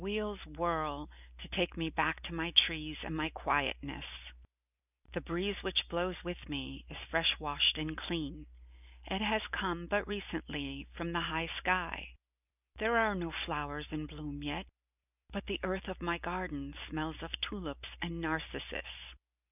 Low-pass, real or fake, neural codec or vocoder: 3.6 kHz; real; none